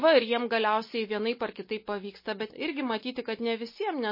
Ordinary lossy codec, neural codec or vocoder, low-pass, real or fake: MP3, 24 kbps; none; 5.4 kHz; real